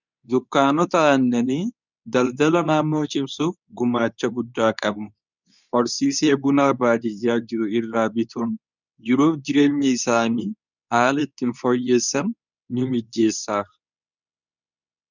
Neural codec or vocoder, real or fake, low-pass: codec, 24 kHz, 0.9 kbps, WavTokenizer, medium speech release version 2; fake; 7.2 kHz